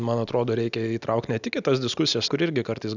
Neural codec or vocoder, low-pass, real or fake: none; 7.2 kHz; real